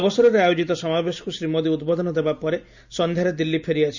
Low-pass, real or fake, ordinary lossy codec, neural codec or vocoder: 7.2 kHz; real; none; none